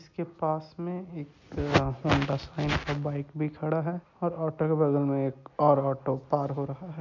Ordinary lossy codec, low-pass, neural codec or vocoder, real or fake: MP3, 64 kbps; 7.2 kHz; none; real